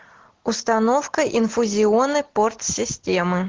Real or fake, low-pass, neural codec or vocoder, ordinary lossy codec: real; 7.2 kHz; none; Opus, 16 kbps